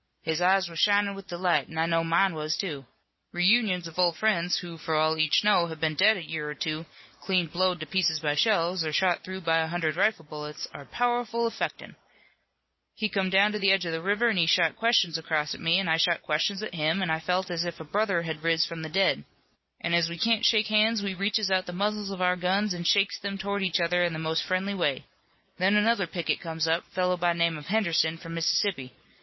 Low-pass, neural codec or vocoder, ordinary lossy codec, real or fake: 7.2 kHz; none; MP3, 24 kbps; real